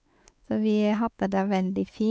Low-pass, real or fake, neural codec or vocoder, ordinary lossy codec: none; fake; codec, 16 kHz, 4 kbps, X-Codec, WavLM features, trained on Multilingual LibriSpeech; none